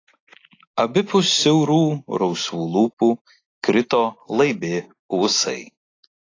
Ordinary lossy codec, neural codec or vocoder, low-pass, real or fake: AAC, 32 kbps; none; 7.2 kHz; real